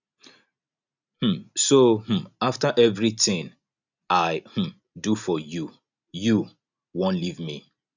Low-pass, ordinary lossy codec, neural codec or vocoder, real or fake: 7.2 kHz; none; none; real